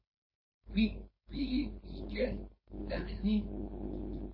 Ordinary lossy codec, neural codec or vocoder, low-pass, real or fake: MP3, 24 kbps; codec, 16 kHz, 4.8 kbps, FACodec; 5.4 kHz; fake